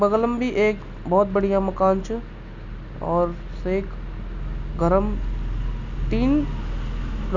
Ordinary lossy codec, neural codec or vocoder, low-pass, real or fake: none; none; 7.2 kHz; real